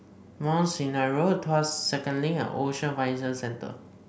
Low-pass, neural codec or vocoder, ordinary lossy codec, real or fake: none; none; none; real